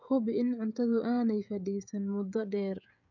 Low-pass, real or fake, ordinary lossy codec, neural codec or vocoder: 7.2 kHz; fake; none; codec, 16 kHz, 16 kbps, FreqCodec, smaller model